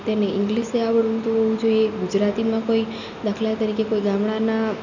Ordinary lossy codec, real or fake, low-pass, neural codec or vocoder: none; real; 7.2 kHz; none